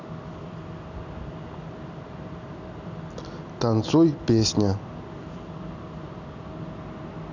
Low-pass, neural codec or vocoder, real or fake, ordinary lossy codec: 7.2 kHz; none; real; none